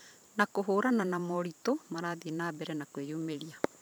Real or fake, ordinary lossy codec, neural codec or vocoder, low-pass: fake; none; vocoder, 44.1 kHz, 128 mel bands every 512 samples, BigVGAN v2; none